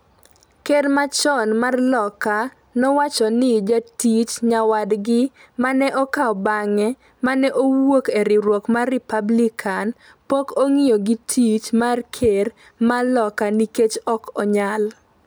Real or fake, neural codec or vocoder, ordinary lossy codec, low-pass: real; none; none; none